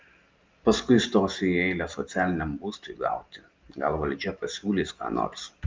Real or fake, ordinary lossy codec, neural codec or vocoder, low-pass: real; Opus, 24 kbps; none; 7.2 kHz